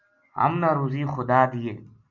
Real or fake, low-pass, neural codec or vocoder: real; 7.2 kHz; none